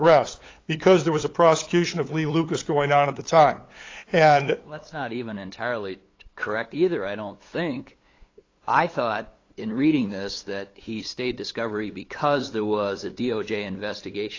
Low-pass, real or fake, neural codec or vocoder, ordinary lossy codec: 7.2 kHz; fake; codec, 16 kHz, 8 kbps, FunCodec, trained on LibriTTS, 25 frames a second; AAC, 32 kbps